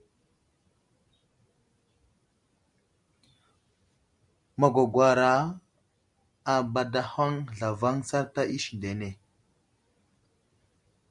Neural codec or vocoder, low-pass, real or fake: none; 10.8 kHz; real